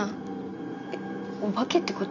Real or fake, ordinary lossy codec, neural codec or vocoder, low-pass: real; none; none; 7.2 kHz